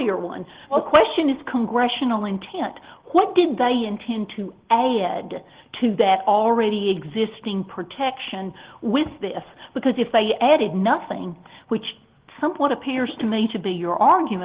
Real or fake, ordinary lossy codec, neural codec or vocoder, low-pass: real; Opus, 16 kbps; none; 3.6 kHz